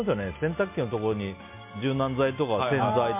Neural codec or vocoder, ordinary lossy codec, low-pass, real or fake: none; MP3, 32 kbps; 3.6 kHz; real